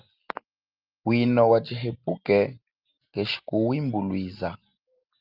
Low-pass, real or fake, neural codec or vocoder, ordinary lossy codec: 5.4 kHz; real; none; Opus, 24 kbps